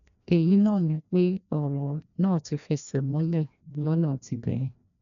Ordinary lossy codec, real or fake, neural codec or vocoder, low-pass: none; fake; codec, 16 kHz, 1 kbps, FreqCodec, larger model; 7.2 kHz